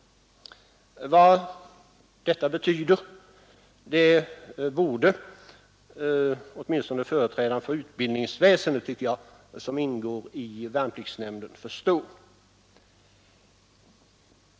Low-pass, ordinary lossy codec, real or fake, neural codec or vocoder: none; none; real; none